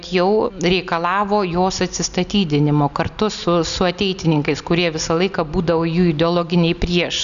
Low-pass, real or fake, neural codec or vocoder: 7.2 kHz; real; none